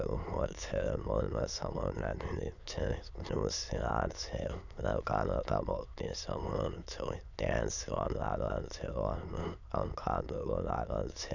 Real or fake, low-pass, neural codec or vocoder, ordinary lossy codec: fake; 7.2 kHz; autoencoder, 22.05 kHz, a latent of 192 numbers a frame, VITS, trained on many speakers; none